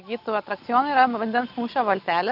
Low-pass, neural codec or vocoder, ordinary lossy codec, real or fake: 5.4 kHz; none; AAC, 48 kbps; real